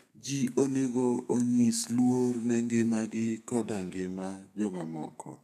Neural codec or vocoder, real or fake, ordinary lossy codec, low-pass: codec, 32 kHz, 1.9 kbps, SNAC; fake; none; 14.4 kHz